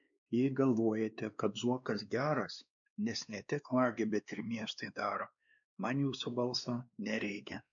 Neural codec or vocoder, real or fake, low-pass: codec, 16 kHz, 2 kbps, X-Codec, WavLM features, trained on Multilingual LibriSpeech; fake; 7.2 kHz